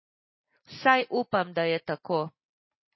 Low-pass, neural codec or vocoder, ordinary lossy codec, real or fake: 7.2 kHz; none; MP3, 24 kbps; real